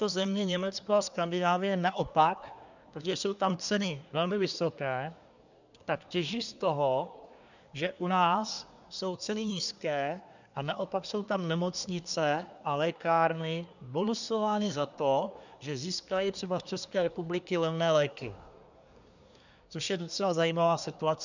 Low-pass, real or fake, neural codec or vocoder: 7.2 kHz; fake; codec, 24 kHz, 1 kbps, SNAC